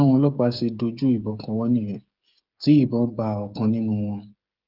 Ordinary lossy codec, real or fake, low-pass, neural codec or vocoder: Opus, 32 kbps; fake; 5.4 kHz; codec, 16 kHz, 8 kbps, FreqCodec, smaller model